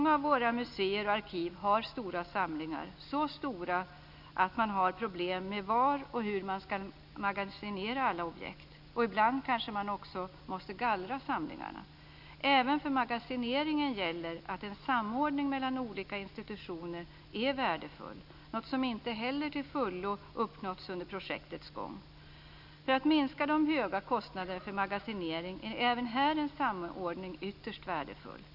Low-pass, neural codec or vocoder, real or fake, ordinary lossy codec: 5.4 kHz; none; real; none